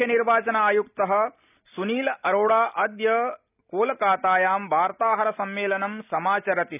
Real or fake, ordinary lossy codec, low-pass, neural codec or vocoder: real; none; 3.6 kHz; none